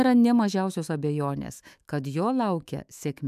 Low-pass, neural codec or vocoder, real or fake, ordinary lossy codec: 14.4 kHz; autoencoder, 48 kHz, 128 numbers a frame, DAC-VAE, trained on Japanese speech; fake; AAC, 96 kbps